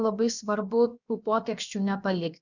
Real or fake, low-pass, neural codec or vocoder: fake; 7.2 kHz; codec, 16 kHz, about 1 kbps, DyCAST, with the encoder's durations